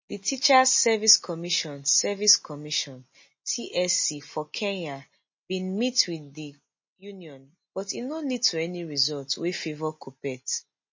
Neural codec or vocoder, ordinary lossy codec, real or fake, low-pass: none; MP3, 32 kbps; real; 7.2 kHz